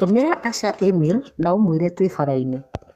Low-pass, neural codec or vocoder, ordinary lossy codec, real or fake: 14.4 kHz; codec, 32 kHz, 1.9 kbps, SNAC; Opus, 64 kbps; fake